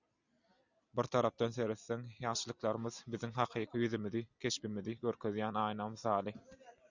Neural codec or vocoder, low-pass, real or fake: none; 7.2 kHz; real